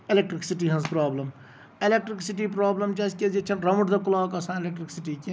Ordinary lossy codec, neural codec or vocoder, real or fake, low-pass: none; none; real; none